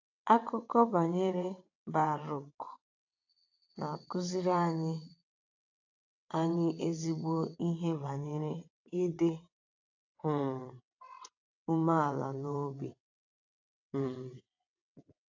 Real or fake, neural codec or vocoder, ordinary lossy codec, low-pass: fake; vocoder, 22.05 kHz, 80 mel bands, WaveNeXt; AAC, 48 kbps; 7.2 kHz